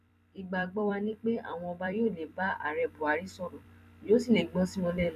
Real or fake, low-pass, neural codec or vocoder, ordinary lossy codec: fake; 14.4 kHz; vocoder, 44.1 kHz, 128 mel bands every 256 samples, BigVGAN v2; none